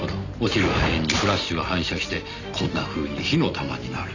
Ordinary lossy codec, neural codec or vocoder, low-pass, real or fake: none; none; 7.2 kHz; real